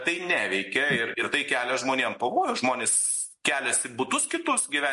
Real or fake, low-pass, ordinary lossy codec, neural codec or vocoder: real; 14.4 kHz; MP3, 48 kbps; none